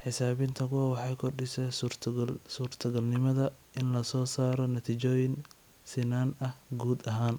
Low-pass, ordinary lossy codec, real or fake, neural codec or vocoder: none; none; real; none